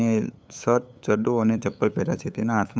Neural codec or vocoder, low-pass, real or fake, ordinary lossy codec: codec, 16 kHz, 8 kbps, FunCodec, trained on LibriTTS, 25 frames a second; none; fake; none